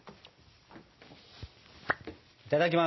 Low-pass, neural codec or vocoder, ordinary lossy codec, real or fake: 7.2 kHz; none; MP3, 24 kbps; real